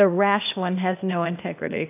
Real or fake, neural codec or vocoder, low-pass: fake; codec, 16 kHz, 0.8 kbps, ZipCodec; 3.6 kHz